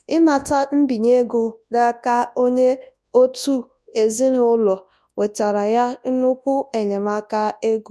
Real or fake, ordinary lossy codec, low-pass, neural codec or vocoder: fake; none; none; codec, 24 kHz, 0.9 kbps, WavTokenizer, large speech release